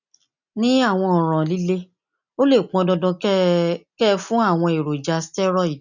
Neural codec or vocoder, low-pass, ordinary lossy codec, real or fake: none; 7.2 kHz; none; real